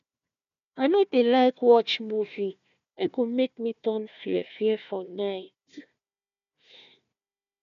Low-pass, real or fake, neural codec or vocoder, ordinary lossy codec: 7.2 kHz; fake; codec, 16 kHz, 1 kbps, FunCodec, trained on Chinese and English, 50 frames a second; none